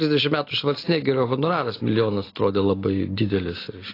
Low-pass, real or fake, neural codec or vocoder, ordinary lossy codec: 5.4 kHz; real; none; AAC, 24 kbps